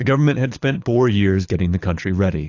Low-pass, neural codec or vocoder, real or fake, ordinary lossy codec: 7.2 kHz; codec, 24 kHz, 6 kbps, HILCodec; fake; AAC, 48 kbps